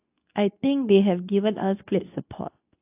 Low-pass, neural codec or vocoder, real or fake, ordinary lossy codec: 3.6 kHz; codec, 24 kHz, 0.9 kbps, WavTokenizer, medium speech release version 2; fake; AAC, 32 kbps